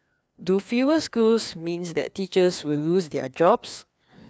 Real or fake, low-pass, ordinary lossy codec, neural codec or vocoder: fake; none; none; codec, 16 kHz, 2 kbps, FreqCodec, larger model